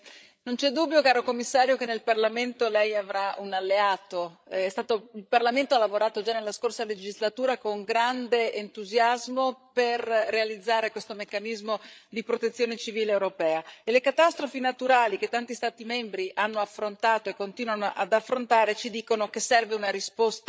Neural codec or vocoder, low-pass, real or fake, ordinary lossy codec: codec, 16 kHz, 8 kbps, FreqCodec, larger model; none; fake; none